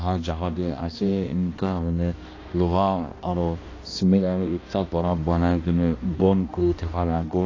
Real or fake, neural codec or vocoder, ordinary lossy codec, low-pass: fake; codec, 16 kHz, 1 kbps, X-Codec, HuBERT features, trained on balanced general audio; AAC, 32 kbps; 7.2 kHz